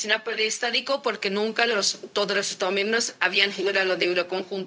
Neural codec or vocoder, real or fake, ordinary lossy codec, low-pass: codec, 16 kHz, 0.4 kbps, LongCat-Audio-Codec; fake; none; none